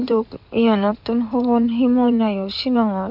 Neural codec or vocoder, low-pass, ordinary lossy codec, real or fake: codec, 16 kHz in and 24 kHz out, 2.2 kbps, FireRedTTS-2 codec; 5.4 kHz; none; fake